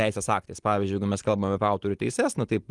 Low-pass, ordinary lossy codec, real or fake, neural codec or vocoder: 10.8 kHz; Opus, 24 kbps; real; none